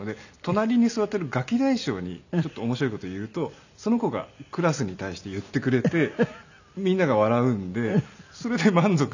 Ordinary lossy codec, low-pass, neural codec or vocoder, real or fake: none; 7.2 kHz; none; real